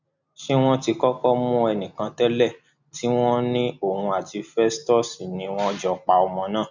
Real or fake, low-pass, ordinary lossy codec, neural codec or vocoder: real; 7.2 kHz; none; none